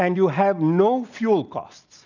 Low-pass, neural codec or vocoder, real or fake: 7.2 kHz; none; real